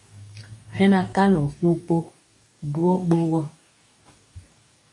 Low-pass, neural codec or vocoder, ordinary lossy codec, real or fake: 10.8 kHz; codec, 44.1 kHz, 2.6 kbps, DAC; MP3, 48 kbps; fake